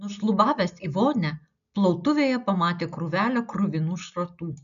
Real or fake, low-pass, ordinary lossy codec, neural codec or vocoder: real; 7.2 kHz; MP3, 96 kbps; none